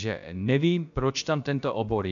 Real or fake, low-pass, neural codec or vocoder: fake; 7.2 kHz; codec, 16 kHz, about 1 kbps, DyCAST, with the encoder's durations